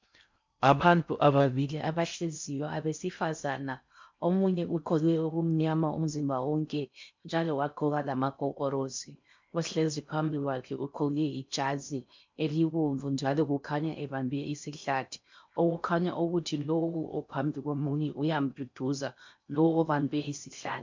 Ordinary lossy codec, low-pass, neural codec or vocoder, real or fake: MP3, 64 kbps; 7.2 kHz; codec, 16 kHz in and 24 kHz out, 0.6 kbps, FocalCodec, streaming, 4096 codes; fake